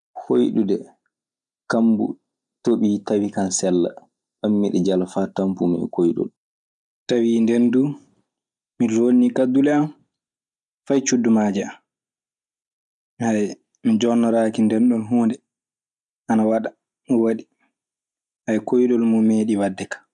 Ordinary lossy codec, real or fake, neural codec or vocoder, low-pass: none; real; none; 10.8 kHz